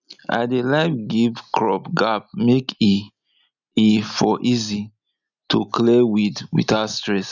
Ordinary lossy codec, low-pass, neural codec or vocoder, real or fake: none; 7.2 kHz; none; real